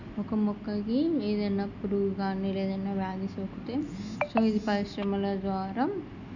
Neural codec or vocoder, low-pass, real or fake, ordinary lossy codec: none; 7.2 kHz; real; none